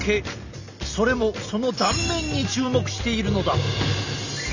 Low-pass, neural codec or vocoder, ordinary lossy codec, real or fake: 7.2 kHz; none; none; real